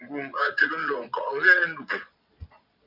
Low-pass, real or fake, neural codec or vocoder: 5.4 kHz; fake; vocoder, 22.05 kHz, 80 mel bands, Vocos